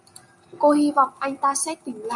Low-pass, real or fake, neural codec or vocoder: 10.8 kHz; real; none